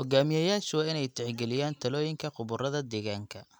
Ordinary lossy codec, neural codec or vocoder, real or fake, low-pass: none; none; real; none